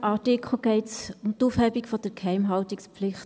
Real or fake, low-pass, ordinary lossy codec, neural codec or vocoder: real; none; none; none